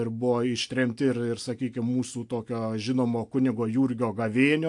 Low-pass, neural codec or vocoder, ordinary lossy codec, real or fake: 10.8 kHz; none; AAC, 64 kbps; real